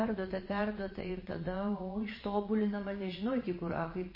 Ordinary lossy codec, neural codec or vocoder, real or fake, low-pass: MP3, 24 kbps; vocoder, 22.05 kHz, 80 mel bands, Vocos; fake; 5.4 kHz